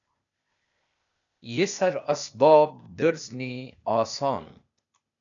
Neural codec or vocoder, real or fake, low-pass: codec, 16 kHz, 0.8 kbps, ZipCodec; fake; 7.2 kHz